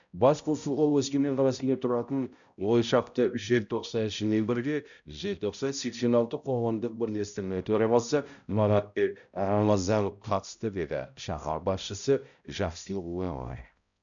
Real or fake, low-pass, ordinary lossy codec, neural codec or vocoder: fake; 7.2 kHz; none; codec, 16 kHz, 0.5 kbps, X-Codec, HuBERT features, trained on balanced general audio